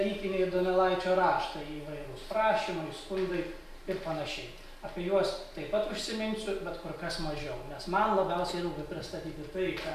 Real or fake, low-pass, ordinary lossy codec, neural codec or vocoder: real; 14.4 kHz; AAC, 96 kbps; none